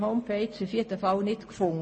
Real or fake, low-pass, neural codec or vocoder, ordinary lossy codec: real; 9.9 kHz; none; MP3, 32 kbps